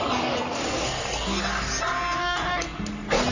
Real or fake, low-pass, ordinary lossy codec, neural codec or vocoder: fake; 7.2 kHz; Opus, 64 kbps; codec, 44.1 kHz, 3.4 kbps, Pupu-Codec